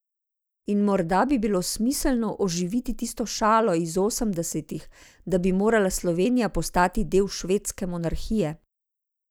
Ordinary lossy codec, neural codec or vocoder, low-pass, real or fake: none; none; none; real